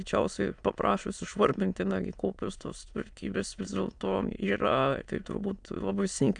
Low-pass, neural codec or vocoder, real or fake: 9.9 kHz; autoencoder, 22.05 kHz, a latent of 192 numbers a frame, VITS, trained on many speakers; fake